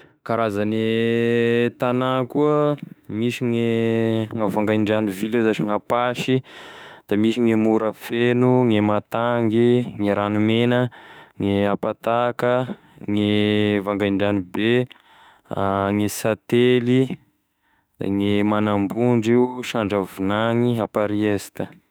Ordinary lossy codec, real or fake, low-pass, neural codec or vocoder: none; fake; none; autoencoder, 48 kHz, 32 numbers a frame, DAC-VAE, trained on Japanese speech